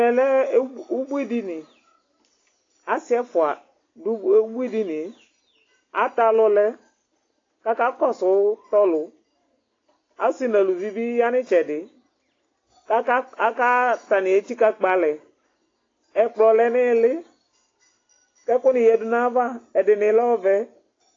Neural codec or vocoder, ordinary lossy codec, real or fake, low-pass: none; AAC, 32 kbps; real; 7.2 kHz